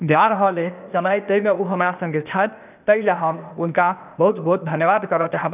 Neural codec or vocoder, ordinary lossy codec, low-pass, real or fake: codec, 16 kHz, 0.8 kbps, ZipCodec; none; 3.6 kHz; fake